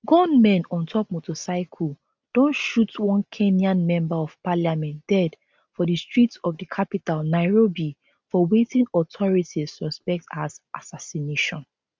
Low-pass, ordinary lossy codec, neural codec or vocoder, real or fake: none; none; none; real